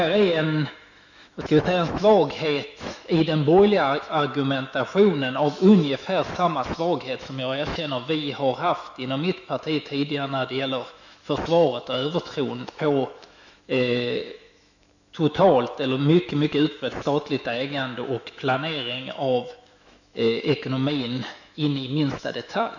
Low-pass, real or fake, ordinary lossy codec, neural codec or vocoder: 7.2 kHz; fake; none; autoencoder, 48 kHz, 128 numbers a frame, DAC-VAE, trained on Japanese speech